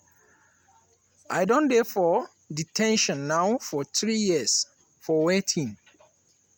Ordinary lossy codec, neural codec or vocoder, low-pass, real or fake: none; none; 19.8 kHz; real